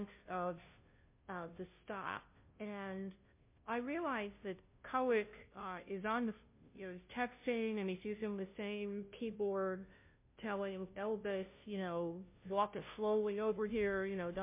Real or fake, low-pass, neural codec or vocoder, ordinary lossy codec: fake; 3.6 kHz; codec, 16 kHz, 0.5 kbps, FunCodec, trained on Chinese and English, 25 frames a second; MP3, 24 kbps